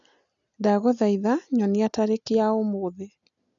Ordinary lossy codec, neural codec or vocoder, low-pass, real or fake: none; none; 7.2 kHz; real